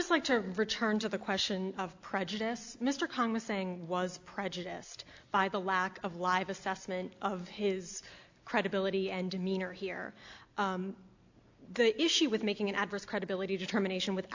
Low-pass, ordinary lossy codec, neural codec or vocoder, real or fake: 7.2 kHz; AAC, 48 kbps; none; real